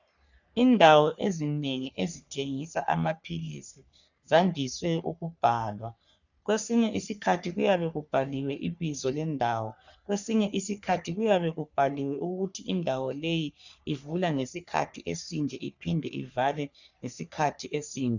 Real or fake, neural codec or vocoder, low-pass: fake; codec, 44.1 kHz, 3.4 kbps, Pupu-Codec; 7.2 kHz